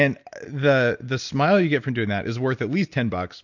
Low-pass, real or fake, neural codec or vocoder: 7.2 kHz; real; none